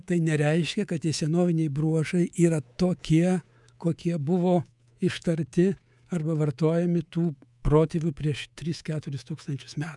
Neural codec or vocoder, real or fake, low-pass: codec, 24 kHz, 3.1 kbps, DualCodec; fake; 10.8 kHz